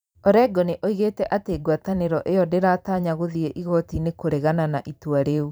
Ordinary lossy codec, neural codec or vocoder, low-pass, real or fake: none; vocoder, 44.1 kHz, 128 mel bands every 512 samples, BigVGAN v2; none; fake